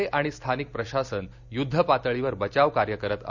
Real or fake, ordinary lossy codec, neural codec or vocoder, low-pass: real; none; none; 7.2 kHz